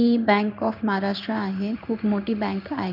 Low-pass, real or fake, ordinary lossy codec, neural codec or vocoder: 5.4 kHz; fake; none; codec, 16 kHz in and 24 kHz out, 1 kbps, XY-Tokenizer